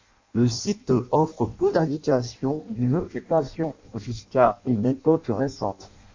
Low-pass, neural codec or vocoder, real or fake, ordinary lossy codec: 7.2 kHz; codec, 16 kHz in and 24 kHz out, 0.6 kbps, FireRedTTS-2 codec; fake; MP3, 64 kbps